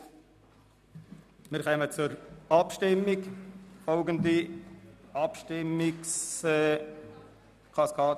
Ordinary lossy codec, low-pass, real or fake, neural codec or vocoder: none; 14.4 kHz; real; none